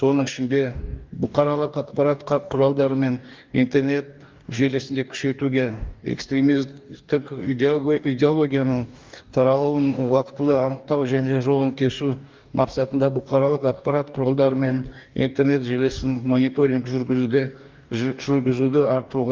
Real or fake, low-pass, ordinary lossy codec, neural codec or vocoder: fake; 7.2 kHz; Opus, 32 kbps; codec, 44.1 kHz, 2.6 kbps, DAC